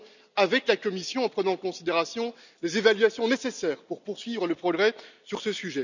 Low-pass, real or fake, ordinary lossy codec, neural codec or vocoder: 7.2 kHz; real; none; none